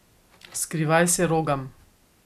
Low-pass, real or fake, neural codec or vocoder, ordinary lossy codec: 14.4 kHz; real; none; none